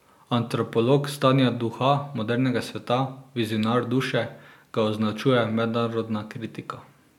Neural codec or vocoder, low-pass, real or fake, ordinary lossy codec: none; 19.8 kHz; real; none